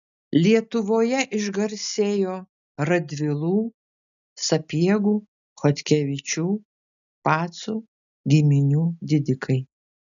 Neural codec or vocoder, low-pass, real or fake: none; 7.2 kHz; real